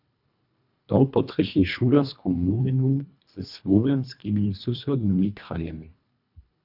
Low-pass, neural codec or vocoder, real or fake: 5.4 kHz; codec, 24 kHz, 1.5 kbps, HILCodec; fake